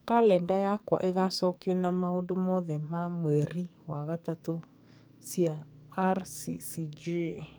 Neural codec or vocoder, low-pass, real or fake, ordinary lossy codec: codec, 44.1 kHz, 2.6 kbps, SNAC; none; fake; none